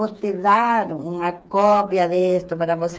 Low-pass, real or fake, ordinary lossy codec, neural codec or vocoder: none; fake; none; codec, 16 kHz, 4 kbps, FreqCodec, smaller model